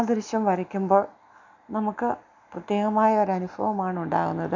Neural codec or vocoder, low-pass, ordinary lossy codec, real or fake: none; 7.2 kHz; none; real